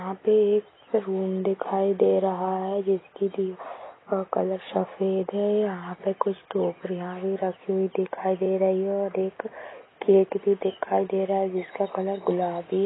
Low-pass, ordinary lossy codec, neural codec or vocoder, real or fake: 7.2 kHz; AAC, 16 kbps; none; real